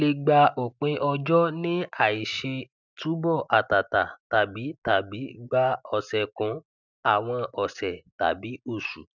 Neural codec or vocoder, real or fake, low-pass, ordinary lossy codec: none; real; 7.2 kHz; none